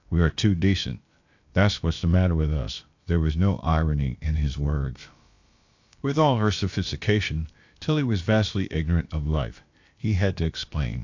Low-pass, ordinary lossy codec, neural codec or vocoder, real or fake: 7.2 kHz; AAC, 48 kbps; codec, 16 kHz, about 1 kbps, DyCAST, with the encoder's durations; fake